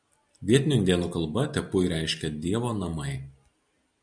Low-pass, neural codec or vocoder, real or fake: 9.9 kHz; none; real